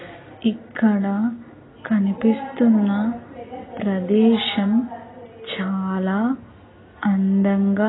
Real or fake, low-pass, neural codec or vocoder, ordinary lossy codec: real; 7.2 kHz; none; AAC, 16 kbps